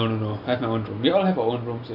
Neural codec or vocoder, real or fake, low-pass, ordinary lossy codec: none; real; 5.4 kHz; none